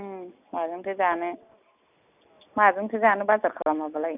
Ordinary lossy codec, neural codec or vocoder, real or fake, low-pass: none; none; real; 3.6 kHz